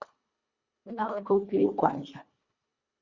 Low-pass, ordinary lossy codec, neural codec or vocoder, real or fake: 7.2 kHz; Opus, 64 kbps; codec, 24 kHz, 1.5 kbps, HILCodec; fake